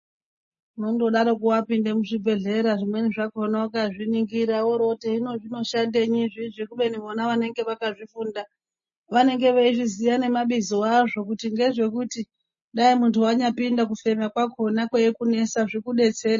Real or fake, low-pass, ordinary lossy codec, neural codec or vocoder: real; 7.2 kHz; MP3, 32 kbps; none